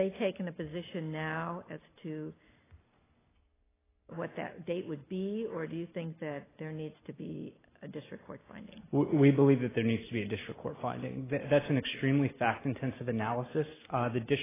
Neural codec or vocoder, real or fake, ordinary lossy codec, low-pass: none; real; AAC, 16 kbps; 3.6 kHz